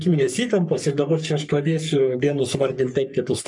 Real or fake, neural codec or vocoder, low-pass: fake; codec, 44.1 kHz, 3.4 kbps, Pupu-Codec; 10.8 kHz